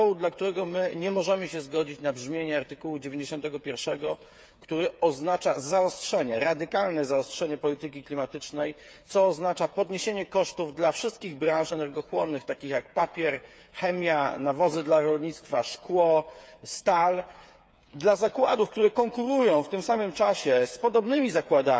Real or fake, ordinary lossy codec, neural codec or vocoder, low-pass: fake; none; codec, 16 kHz, 8 kbps, FreqCodec, smaller model; none